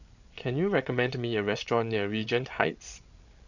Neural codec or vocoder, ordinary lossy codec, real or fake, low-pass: codec, 16 kHz, 8 kbps, FreqCodec, larger model; none; fake; 7.2 kHz